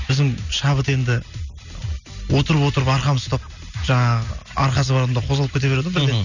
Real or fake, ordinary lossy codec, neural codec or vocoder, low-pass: real; none; none; 7.2 kHz